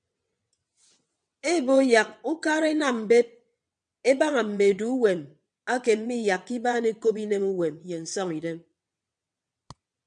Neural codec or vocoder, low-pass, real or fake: vocoder, 22.05 kHz, 80 mel bands, WaveNeXt; 9.9 kHz; fake